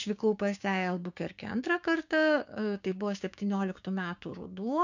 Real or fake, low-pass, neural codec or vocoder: fake; 7.2 kHz; codec, 16 kHz, 6 kbps, DAC